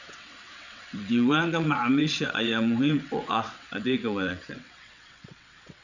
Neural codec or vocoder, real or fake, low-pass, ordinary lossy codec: vocoder, 22.05 kHz, 80 mel bands, WaveNeXt; fake; 7.2 kHz; none